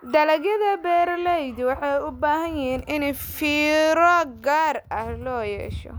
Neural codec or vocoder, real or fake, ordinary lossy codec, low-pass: none; real; none; none